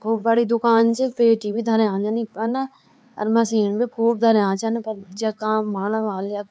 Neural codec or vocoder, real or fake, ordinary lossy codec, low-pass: codec, 16 kHz, 4 kbps, X-Codec, HuBERT features, trained on LibriSpeech; fake; none; none